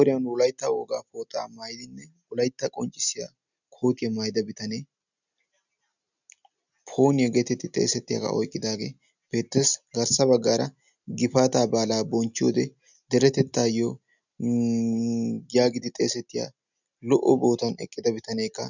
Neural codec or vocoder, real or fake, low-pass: none; real; 7.2 kHz